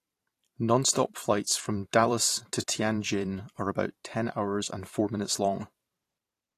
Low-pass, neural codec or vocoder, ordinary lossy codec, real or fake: 14.4 kHz; vocoder, 44.1 kHz, 128 mel bands every 512 samples, BigVGAN v2; AAC, 48 kbps; fake